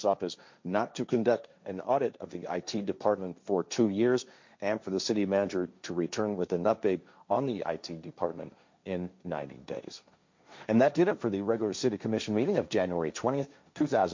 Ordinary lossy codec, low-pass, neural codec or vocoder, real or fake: MP3, 48 kbps; 7.2 kHz; codec, 16 kHz, 1.1 kbps, Voila-Tokenizer; fake